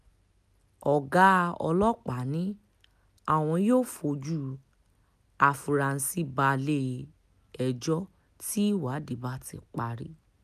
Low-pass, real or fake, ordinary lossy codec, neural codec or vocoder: 14.4 kHz; real; none; none